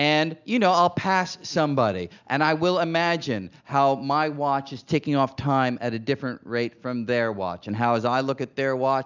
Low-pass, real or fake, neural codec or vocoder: 7.2 kHz; real; none